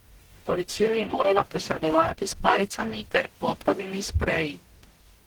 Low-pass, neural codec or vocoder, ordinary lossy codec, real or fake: 19.8 kHz; codec, 44.1 kHz, 0.9 kbps, DAC; Opus, 16 kbps; fake